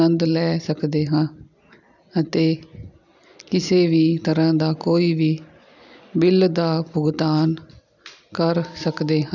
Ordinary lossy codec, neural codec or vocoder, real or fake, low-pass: none; none; real; 7.2 kHz